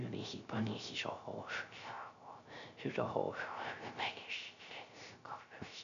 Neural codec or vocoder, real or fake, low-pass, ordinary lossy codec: codec, 16 kHz, 0.3 kbps, FocalCodec; fake; 7.2 kHz; none